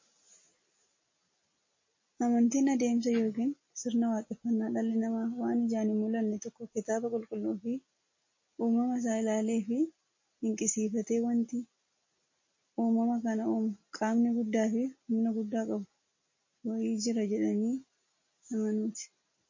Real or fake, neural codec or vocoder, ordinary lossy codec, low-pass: real; none; MP3, 32 kbps; 7.2 kHz